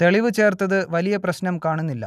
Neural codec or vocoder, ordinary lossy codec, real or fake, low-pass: none; none; real; 14.4 kHz